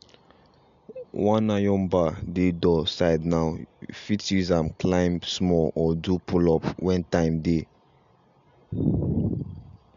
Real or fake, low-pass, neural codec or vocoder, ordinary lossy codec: real; 7.2 kHz; none; MP3, 64 kbps